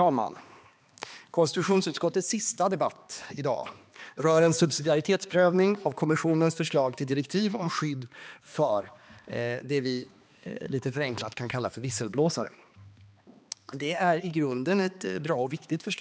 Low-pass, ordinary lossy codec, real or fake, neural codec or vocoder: none; none; fake; codec, 16 kHz, 2 kbps, X-Codec, HuBERT features, trained on balanced general audio